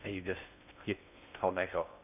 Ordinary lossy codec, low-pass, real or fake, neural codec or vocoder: none; 3.6 kHz; fake; codec, 16 kHz in and 24 kHz out, 0.6 kbps, FocalCodec, streaming, 2048 codes